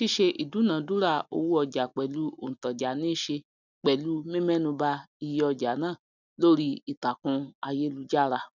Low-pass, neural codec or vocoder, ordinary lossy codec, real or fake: 7.2 kHz; none; none; real